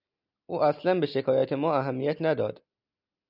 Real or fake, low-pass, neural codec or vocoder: real; 5.4 kHz; none